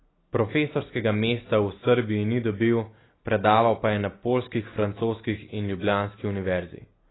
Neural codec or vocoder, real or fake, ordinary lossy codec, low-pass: none; real; AAC, 16 kbps; 7.2 kHz